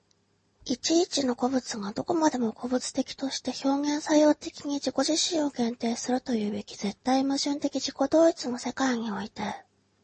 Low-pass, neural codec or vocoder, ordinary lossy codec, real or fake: 10.8 kHz; none; MP3, 32 kbps; real